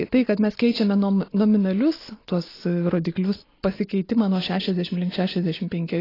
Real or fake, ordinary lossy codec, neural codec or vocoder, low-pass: real; AAC, 24 kbps; none; 5.4 kHz